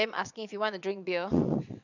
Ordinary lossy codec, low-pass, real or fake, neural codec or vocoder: none; 7.2 kHz; fake; vocoder, 22.05 kHz, 80 mel bands, WaveNeXt